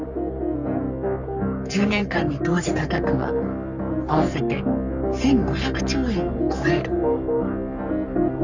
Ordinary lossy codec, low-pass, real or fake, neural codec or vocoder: none; 7.2 kHz; fake; codec, 44.1 kHz, 3.4 kbps, Pupu-Codec